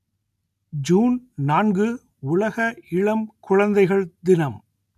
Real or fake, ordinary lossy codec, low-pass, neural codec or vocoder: real; none; 14.4 kHz; none